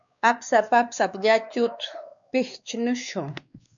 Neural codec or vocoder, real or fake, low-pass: codec, 16 kHz, 2 kbps, X-Codec, WavLM features, trained on Multilingual LibriSpeech; fake; 7.2 kHz